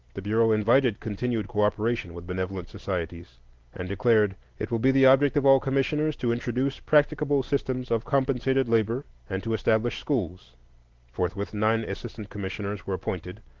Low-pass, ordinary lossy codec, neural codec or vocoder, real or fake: 7.2 kHz; Opus, 16 kbps; none; real